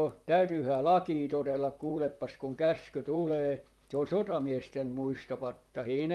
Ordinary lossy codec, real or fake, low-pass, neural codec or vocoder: Opus, 32 kbps; fake; 19.8 kHz; vocoder, 44.1 kHz, 128 mel bands, Pupu-Vocoder